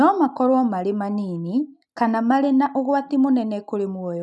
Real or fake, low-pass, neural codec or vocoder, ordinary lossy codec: real; none; none; none